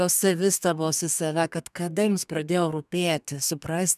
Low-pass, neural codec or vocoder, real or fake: 14.4 kHz; codec, 32 kHz, 1.9 kbps, SNAC; fake